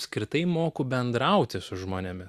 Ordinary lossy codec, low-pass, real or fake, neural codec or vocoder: Opus, 64 kbps; 14.4 kHz; real; none